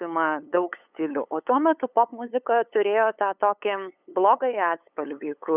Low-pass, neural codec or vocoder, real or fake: 3.6 kHz; codec, 16 kHz, 8 kbps, FunCodec, trained on LibriTTS, 25 frames a second; fake